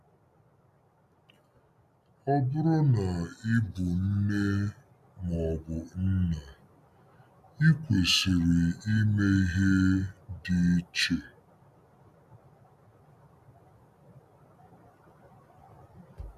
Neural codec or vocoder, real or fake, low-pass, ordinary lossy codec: none; real; 14.4 kHz; none